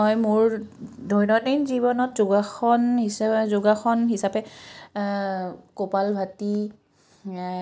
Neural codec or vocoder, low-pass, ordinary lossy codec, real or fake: none; none; none; real